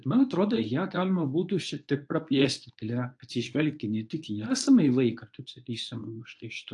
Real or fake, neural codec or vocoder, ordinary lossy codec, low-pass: fake; codec, 24 kHz, 0.9 kbps, WavTokenizer, medium speech release version 1; AAC, 64 kbps; 10.8 kHz